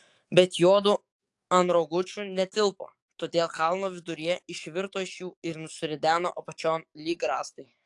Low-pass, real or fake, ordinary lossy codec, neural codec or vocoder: 10.8 kHz; fake; AAC, 64 kbps; codec, 44.1 kHz, 7.8 kbps, DAC